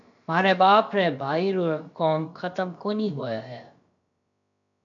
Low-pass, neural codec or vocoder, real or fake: 7.2 kHz; codec, 16 kHz, about 1 kbps, DyCAST, with the encoder's durations; fake